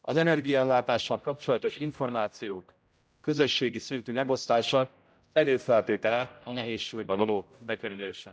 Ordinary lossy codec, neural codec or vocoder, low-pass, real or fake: none; codec, 16 kHz, 0.5 kbps, X-Codec, HuBERT features, trained on general audio; none; fake